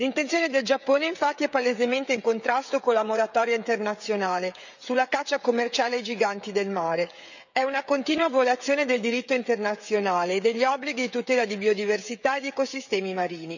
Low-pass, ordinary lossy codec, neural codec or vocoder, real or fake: 7.2 kHz; none; codec, 16 kHz, 16 kbps, FreqCodec, smaller model; fake